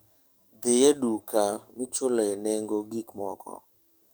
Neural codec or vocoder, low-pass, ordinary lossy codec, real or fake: codec, 44.1 kHz, 7.8 kbps, DAC; none; none; fake